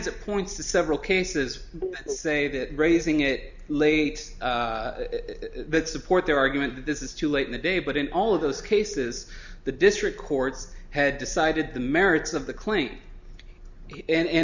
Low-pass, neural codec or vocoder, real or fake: 7.2 kHz; none; real